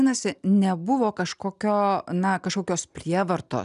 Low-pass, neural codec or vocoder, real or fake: 10.8 kHz; none; real